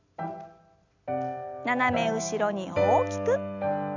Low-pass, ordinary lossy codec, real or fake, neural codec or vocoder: 7.2 kHz; none; real; none